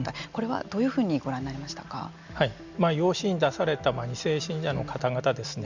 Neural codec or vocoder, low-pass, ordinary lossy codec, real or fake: none; 7.2 kHz; Opus, 64 kbps; real